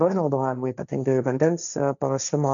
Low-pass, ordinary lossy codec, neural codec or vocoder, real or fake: 7.2 kHz; MP3, 96 kbps; codec, 16 kHz, 1.1 kbps, Voila-Tokenizer; fake